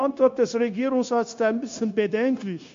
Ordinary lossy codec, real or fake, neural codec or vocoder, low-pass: MP3, 48 kbps; fake; codec, 16 kHz, 0.9 kbps, LongCat-Audio-Codec; 7.2 kHz